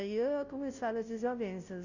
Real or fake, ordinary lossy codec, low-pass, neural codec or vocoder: fake; none; 7.2 kHz; codec, 16 kHz, 0.5 kbps, FunCodec, trained on Chinese and English, 25 frames a second